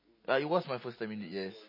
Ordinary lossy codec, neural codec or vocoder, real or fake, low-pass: MP3, 24 kbps; none; real; 5.4 kHz